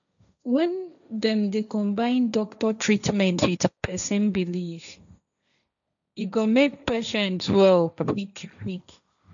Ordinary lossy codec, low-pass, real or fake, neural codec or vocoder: none; 7.2 kHz; fake; codec, 16 kHz, 1.1 kbps, Voila-Tokenizer